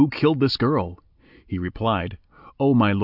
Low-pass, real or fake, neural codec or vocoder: 5.4 kHz; real; none